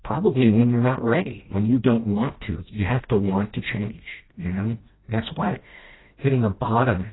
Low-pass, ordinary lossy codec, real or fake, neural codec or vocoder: 7.2 kHz; AAC, 16 kbps; fake; codec, 16 kHz, 1 kbps, FreqCodec, smaller model